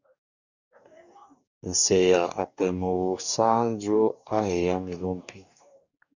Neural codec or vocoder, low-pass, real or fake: codec, 44.1 kHz, 2.6 kbps, DAC; 7.2 kHz; fake